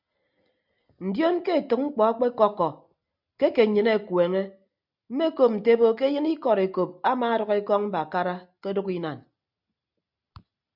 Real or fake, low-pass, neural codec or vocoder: real; 5.4 kHz; none